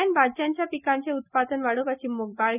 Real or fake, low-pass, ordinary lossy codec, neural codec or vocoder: real; 3.6 kHz; none; none